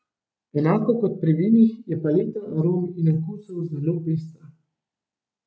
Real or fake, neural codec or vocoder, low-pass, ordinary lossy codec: real; none; none; none